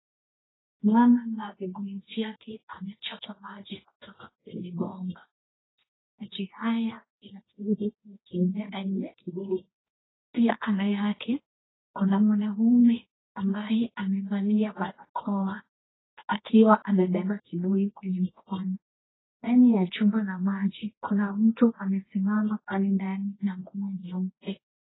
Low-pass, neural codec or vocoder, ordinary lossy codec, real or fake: 7.2 kHz; codec, 24 kHz, 0.9 kbps, WavTokenizer, medium music audio release; AAC, 16 kbps; fake